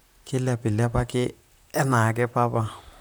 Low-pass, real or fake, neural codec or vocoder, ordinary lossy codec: none; fake; vocoder, 44.1 kHz, 128 mel bands, Pupu-Vocoder; none